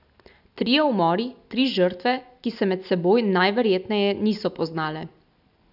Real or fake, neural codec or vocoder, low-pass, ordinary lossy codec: real; none; 5.4 kHz; none